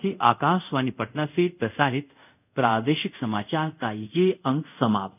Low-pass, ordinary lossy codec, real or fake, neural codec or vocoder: 3.6 kHz; none; fake; codec, 24 kHz, 0.5 kbps, DualCodec